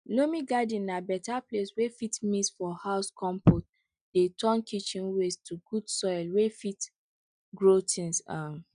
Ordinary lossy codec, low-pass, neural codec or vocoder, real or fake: none; 9.9 kHz; none; real